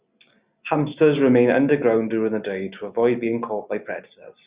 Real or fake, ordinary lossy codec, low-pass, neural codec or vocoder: real; Opus, 64 kbps; 3.6 kHz; none